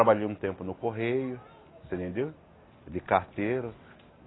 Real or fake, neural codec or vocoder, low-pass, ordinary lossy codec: real; none; 7.2 kHz; AAC, 16 kbps